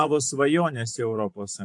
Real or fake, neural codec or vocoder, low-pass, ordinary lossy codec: fake; vocoder, 24 kHz, 100 mel bands, Vocos; 10.8 kHz; AAC, 64 kbps